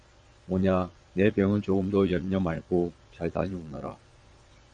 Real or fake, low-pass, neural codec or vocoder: fake; 9.9 kHz; vocoder, 22.05 kHz, 80 mel bands, Vocos